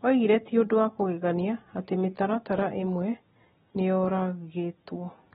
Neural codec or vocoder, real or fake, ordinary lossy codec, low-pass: none; real; AAC, 16 kbps; 7.2 kHz